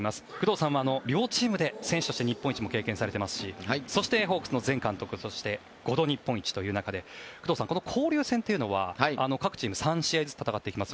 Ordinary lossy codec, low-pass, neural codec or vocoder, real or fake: none; none; none; real